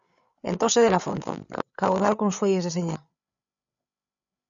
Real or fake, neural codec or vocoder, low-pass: fake; codec, 16 kHz, 8 kbps, FreqCodec, larger model; 7.2 kHz